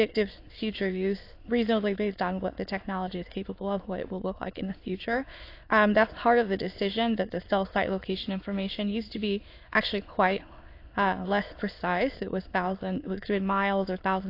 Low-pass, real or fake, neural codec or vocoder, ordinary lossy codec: 5.4 kHz; fake; autoencoder, 22.05 kHz, a latent of 192 numbers a frame, VITS, trained on many speakers; AAC, 32 kbps